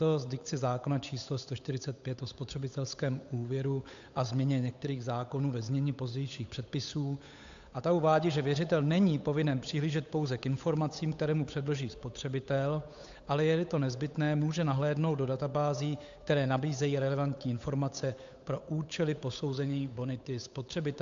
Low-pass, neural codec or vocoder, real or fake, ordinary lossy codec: 7.2 kHz; codec, 16 kHz, 8 kbps, FunCodec, trained on Chinese and English, 25 frames a second; fake; AAC, 64 kbps